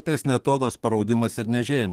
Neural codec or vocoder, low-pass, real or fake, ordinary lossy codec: codec, 32 kHz, 1.9 kbps, SNAC; 14.4 kHz; fake; Opus, 24 kbps